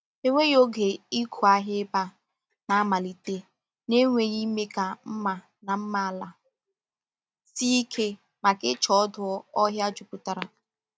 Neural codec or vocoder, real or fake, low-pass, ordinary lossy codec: none; real; none; none